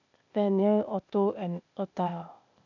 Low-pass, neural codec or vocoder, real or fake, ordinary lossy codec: 7.2 kHz; codec, 16 kHz, 0.8 kbps, ZipCodec; fake; none